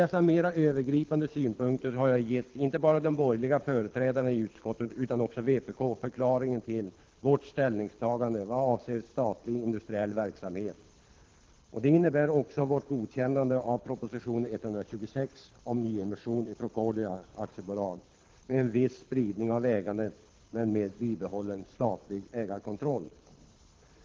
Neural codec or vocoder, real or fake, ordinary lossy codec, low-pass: codec, 24 kHz, 6 kbps, HILCodec; fake; Opus, 16 kbps; 7.2 kHz